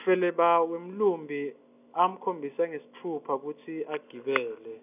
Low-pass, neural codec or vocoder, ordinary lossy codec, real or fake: 3.6 kHz; none; none; real